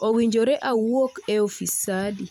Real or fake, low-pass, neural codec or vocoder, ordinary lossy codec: fake; 19.8 kHz; vocoder, 44.1 kHz, 128 mel bands every 256 samples, BigVGAN v2; none